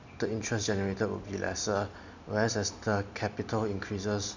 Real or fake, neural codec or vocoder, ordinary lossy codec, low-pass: real; none; none; 7.2 kHz